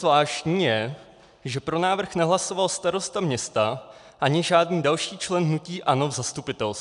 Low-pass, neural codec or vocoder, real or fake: 10.8 kHz; none; real